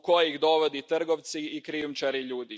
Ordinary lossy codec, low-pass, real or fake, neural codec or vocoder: none; none; real; none